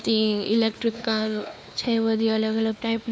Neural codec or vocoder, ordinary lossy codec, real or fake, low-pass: codec, 16 kHz, 2 kbps, X-Codec, WavLM features, trained on Multilingual LibriSpeech; none; fake; none